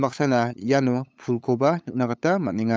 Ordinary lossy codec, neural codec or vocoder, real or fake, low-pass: none; codec, 16 kHz, 8 kbps, FunCodec, trained on LibriTTS, 25 frames a second; fake; none